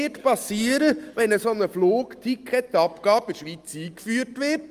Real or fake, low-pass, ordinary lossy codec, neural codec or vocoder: real; 14.4 kHz; Opus, 32 kbps; none